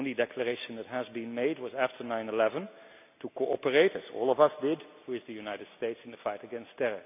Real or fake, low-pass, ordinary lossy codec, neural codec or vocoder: real; 3.6 kHz; none; none